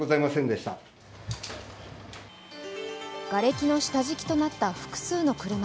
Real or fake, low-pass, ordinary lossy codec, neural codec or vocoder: real; none; none; none